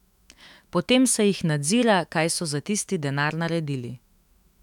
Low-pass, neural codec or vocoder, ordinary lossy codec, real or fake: 19.8 kHz; autoencoder, 48 kHz, 128 numbers a frame, DAC-VAE, trained on Japanese speech; none; fake